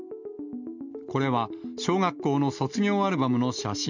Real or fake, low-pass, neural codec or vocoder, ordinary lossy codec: real; 7.2 kHz; none; none